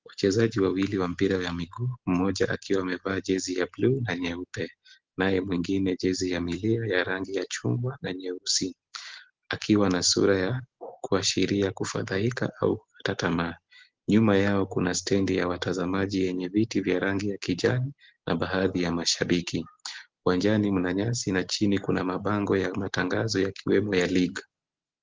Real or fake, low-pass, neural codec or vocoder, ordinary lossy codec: real; 7.2 kHz; none; Opus, 16 kbps